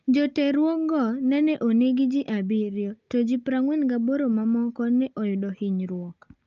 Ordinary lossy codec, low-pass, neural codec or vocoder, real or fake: Opus, 24 kbps; 7.2 kHz; none; real